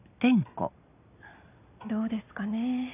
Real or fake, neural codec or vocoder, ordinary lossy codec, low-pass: real; none; none; 3.6 kHz